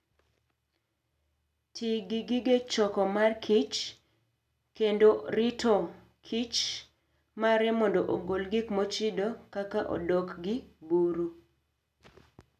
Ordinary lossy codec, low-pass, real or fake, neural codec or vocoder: none; 14.4 kHz; real; none